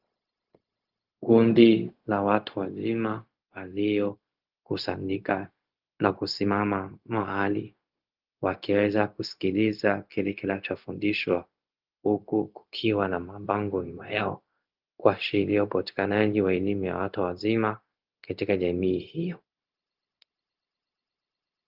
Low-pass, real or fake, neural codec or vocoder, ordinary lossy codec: 5.4 kHz; fake; codec, 16 kHz, 0.4 kbps, LongCat-Audio-Codec; Opus, 32 kbps